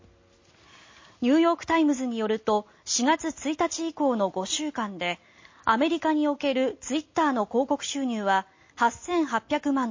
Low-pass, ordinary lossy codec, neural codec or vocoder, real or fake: 7.2 kHz; MP3, 32 kbps; none; real